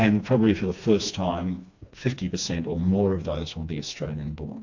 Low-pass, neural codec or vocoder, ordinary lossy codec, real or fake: 7.2 kHz; codec, 16 kHz, 2 kbps, FreqCodec, smaller model; AAC, 48 kbps; fake